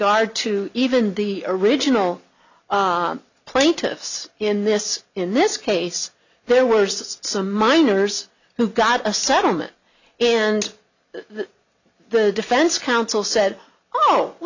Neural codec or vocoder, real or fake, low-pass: none; real; 7.2 kHz